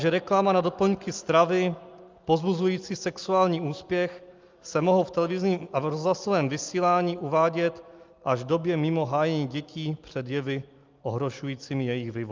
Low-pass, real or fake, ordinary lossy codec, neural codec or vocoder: 7.2 kHz; real; Opus, 24 kbps; none